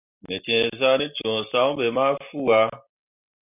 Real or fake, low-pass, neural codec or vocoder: real; 3.6 kHz; none